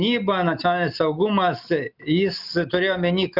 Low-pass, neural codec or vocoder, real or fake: 5.4 kHz; none; real